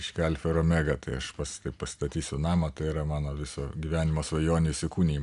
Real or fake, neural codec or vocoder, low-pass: real; none; 10.8 kHz